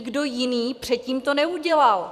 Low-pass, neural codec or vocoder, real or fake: 14.4 kHz; vocoder, 44.1 kHz, 128 mel bands every 256 samples, BigVGAN v2; fake